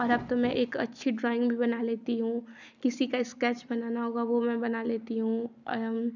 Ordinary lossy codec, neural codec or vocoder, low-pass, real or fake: none; none; 7.2 kHz; real